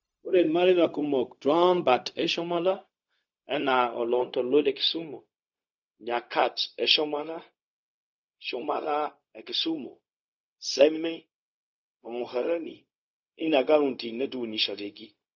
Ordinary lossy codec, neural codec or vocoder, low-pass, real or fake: none; codec, 16 kHz, 0.4 kbps, LongCat-Audio-Codec; 7.2 kHz; fake